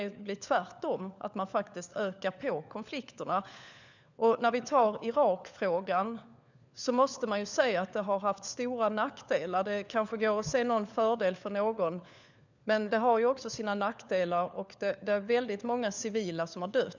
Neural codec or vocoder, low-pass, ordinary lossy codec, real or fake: codec, 16 kHz, 4 kbps, FunCodec, trained on LibriTTS, 50 frames a second; 7.2 kHz; none; fake